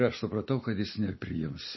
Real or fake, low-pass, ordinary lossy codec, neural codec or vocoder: fake; 7.2 kHz; MP3, 24 kbps; vocoder, 44.1 kHz, 128 mel bands every 256 samples, BigVGAN v2